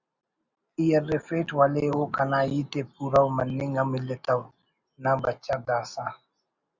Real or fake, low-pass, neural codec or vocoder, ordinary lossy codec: real; 7.2 kHz; none; Opus, 64 kbps